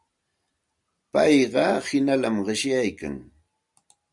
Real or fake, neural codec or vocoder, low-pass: real; none; 10.8 kHz